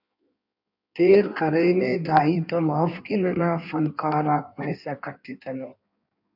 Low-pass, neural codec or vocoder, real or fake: 5.4 kHz; codec, 16 kHz in and 24 kHz out, 1.1 kbps, FireRedTTS-2 codec; fake